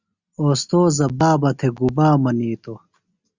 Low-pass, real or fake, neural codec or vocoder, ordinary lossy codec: 7.2 kHz; real; none; Opus, 64 kbps